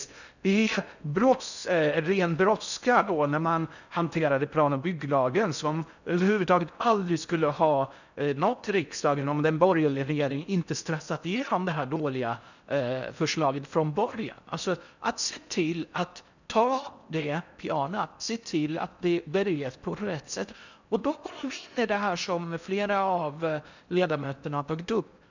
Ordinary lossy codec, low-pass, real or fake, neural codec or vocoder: none; 7.2 kHz; fake; codec, 16 kHz in and 24 kHz out, 0.6 kbps, FocalCodec, streaming, 4096 codes